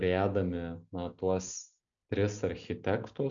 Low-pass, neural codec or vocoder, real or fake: 7.2 kHz; none; real